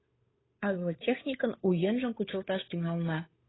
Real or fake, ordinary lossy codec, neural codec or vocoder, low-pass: fake; AAC, 16 kbps; codec, 16 kHz, 8 kbps, FunCodec, trained on Chinese and English, 25 frames a second; 7.2 kHz